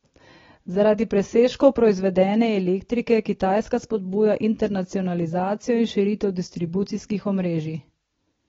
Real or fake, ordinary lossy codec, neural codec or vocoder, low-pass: real; AAC, 24 kbps; none; 7.2 kHz